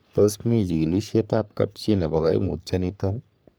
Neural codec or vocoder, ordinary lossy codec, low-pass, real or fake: codec, 44.1 kHz, 3.4 kbps, Pupu-Codec; none; none; fake